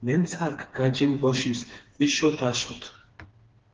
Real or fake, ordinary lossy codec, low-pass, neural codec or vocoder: fake; Opus, 16 kbps; 7.2 kHz; codec, 16 kHz, 2 kbps, FreqCodec, smaller model